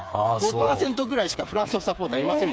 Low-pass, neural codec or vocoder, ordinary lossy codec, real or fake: none; codec, 16 kHz, 4 kbps, FreqCodec, smaller model; none; fake